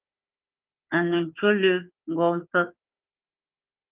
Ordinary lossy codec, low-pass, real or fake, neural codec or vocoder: Opus, 32 kbps; 3.6 kHz; fake; codec, 16 kHz, 4 kbps, FunCodec, trained on Chinese and English, 50 frames a second